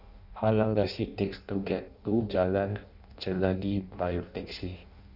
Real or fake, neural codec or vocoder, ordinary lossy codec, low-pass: fake; codec, 16 kHz in and 24 kHz out, 0.6 kbps, FireRedTTS-2 codec; none; 5.4 kHz